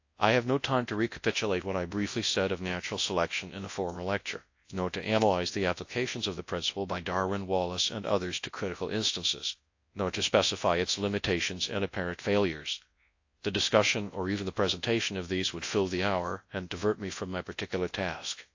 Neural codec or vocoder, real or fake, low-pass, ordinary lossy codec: codec, 24 kHz, 0.9 kbps, WavTokenizer, large speech release; fake; 7.2 kHz; AAC, 48 kbps